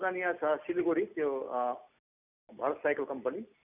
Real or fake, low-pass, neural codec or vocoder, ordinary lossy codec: real; 3.6 kHz; none; none